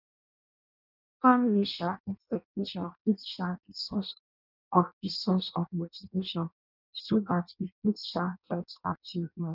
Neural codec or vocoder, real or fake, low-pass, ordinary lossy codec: codec, 16 kHz in and 24 kHz out, 0.6 kbps, FireRedTTS-2 codec; fake; 5.4 kHz; none